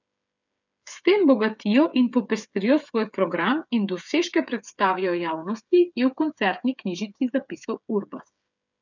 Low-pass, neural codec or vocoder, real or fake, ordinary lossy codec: 7.2 kHz; codec, 16 kHz, 8 kbps, FreqCodec, smaller model; fake; none